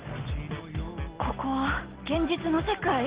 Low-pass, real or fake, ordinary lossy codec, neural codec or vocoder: 3.6 kHz; real; Opus, 16 kbps; none